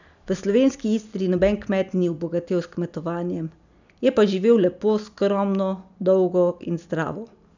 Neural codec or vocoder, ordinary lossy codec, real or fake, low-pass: none; none; real; 7.2 kHz